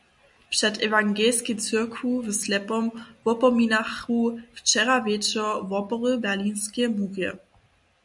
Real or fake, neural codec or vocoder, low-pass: real; none; 10.8 kHz